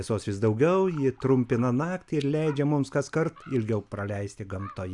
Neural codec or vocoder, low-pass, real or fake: none; 10.8 kHz; real